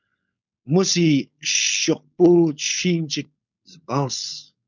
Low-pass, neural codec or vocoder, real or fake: 7.2 kHz; codec, 16 kHz, 4.8 kbps, FACodec; fake